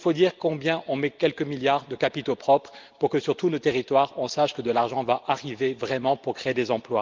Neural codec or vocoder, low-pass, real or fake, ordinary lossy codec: none; 7.2 kHz; real; Opus, 32 kbps